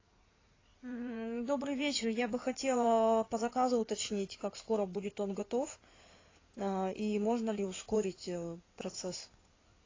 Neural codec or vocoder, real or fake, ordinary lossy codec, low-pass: codec, 16 kHz in and 24 kHz out, 2.2 kbps, FireRedTTS-2 codec; fake; AAC, 32 kbps; 7.2 kHz